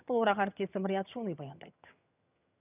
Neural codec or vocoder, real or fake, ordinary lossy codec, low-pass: vocoder, 22.05 kHz, 80 mel bands, HiFi-GAN; fake; none; 3.6 kHz